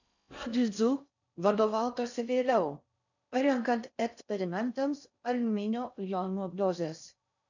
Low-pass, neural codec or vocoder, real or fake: 7.2 kHz; codec, 16 kHz in and 24 kHz out, 0.6 kbps, FocalCodec, streaming, 4096 codes; fake